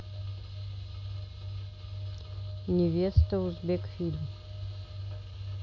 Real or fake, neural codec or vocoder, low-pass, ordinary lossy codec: real; none; none; none